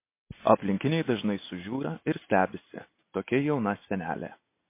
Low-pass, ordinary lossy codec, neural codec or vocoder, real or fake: 3.6 kHz; MP3, 24 kbps; none; real